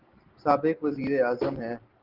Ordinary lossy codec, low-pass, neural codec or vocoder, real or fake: Opus, 16 kbps; 5.4 kHz; none; real